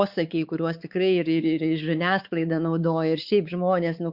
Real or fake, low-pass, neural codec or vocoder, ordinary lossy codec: fake; 5.4 kHz; codec, 16 kHz, 4 kbps, X-Codec, WavLM features, trained on Multilingual LibriSpeech; Opus, 64 kbps